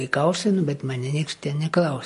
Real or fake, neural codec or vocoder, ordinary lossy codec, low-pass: real; none; MP3, 48 kbps; 10.8 kHz